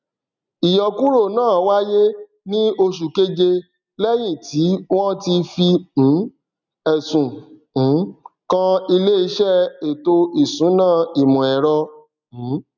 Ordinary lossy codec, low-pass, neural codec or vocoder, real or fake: none; 7.2 kHz; none; real